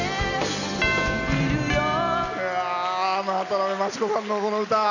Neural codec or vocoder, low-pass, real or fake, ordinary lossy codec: none; 7.2 kHz; real; none